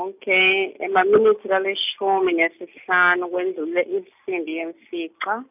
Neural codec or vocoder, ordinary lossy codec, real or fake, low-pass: none; none; real; 3.6 kHz